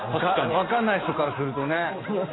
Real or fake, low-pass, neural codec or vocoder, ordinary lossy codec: real; 7.2 kHz; none; AAC, 16 kbps